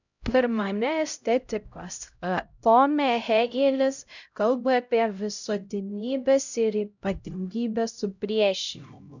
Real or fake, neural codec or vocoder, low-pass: fake; codec, 16 kHz, 0.5 kbps, X-Codec, HuBERT features, trained on LibriSpeech; 7.2 kHz